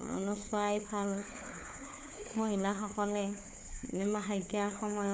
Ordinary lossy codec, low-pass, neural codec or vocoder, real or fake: none; none; codec, 16 kHz, 4 kbps, FunCodec, trained on LibriTTS, 50 frames a second; fake